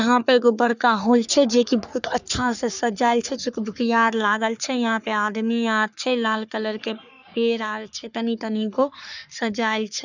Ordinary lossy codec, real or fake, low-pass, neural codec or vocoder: none; fake; 7.2 kHz; codec, 44.1 kHz, 3.4 kbps, Pupu-Codec